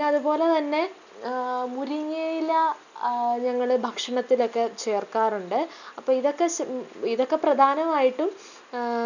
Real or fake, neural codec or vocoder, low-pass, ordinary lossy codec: real; none; 7.2 kHz; none